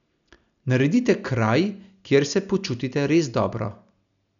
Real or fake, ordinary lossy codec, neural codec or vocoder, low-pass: real; none; none; 7.2 kHz